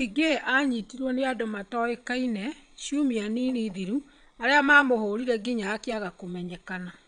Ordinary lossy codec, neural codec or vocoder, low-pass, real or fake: none; vocoder, 22.05 kHz, 80 mel bands, Vocos; 9.9 kHz; fake